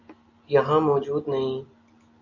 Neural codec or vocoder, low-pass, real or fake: none; 7.2 kHz; real